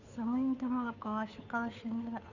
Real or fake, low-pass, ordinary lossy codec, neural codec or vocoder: fake; 7.2 kHz; none; codec, 16 kHz, 2 kbps, FunCodec, trained on Chinese and English, 25 frames a second